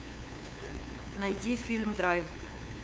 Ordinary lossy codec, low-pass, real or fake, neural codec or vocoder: none; none; fake; codec, 16 kHz, 2 kbps, FunCodec, trained on LibriTTS, 25 frames a second